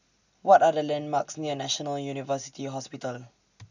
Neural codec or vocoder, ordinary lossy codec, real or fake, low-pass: none; none; real; 7.2 kHz